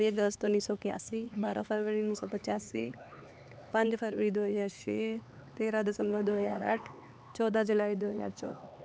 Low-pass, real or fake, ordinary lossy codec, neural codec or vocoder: none; fake; none; codec, 16 kHz, 4 kbps, X-Codec, HuBERT features, trained on LibriSpeech